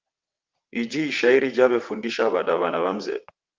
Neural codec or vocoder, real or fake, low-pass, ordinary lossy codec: vocoder, 22.05 kHz, 80 mel bands, WaveNeXt; fake; 7.2 kHz; Opus, 24 kbps